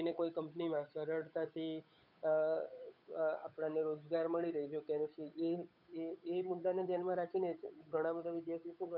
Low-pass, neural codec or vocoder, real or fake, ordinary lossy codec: 5.4 kHz; codec, 16 kHz, 8 kbps, FunCodec, trained on Chinese and English, 25 frames a second; fake; none